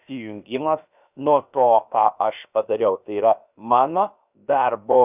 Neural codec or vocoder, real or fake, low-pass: codec, 16 kHz, about 1 kbps, DyCAST, with the encoder's durations; fake; 3.6 kHz